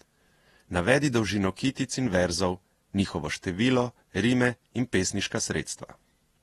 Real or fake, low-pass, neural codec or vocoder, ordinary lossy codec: fake; 19.8 kHz; vocoder, 48 kHz, 128 mel bands, Vocos; AAC, 32 kbps